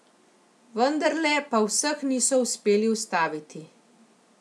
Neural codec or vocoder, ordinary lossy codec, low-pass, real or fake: none; none; none; real